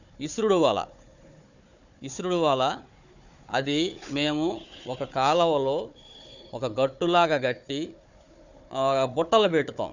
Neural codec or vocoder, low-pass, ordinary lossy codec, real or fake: codec, 16 kHz, 4 kbps, FunCodec, trained on Chinese and English, 50 frames a second; 7.2 kHz; none; fake